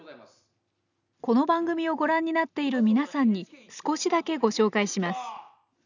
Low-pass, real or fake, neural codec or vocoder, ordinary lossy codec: 7.2 kHz; real; none; none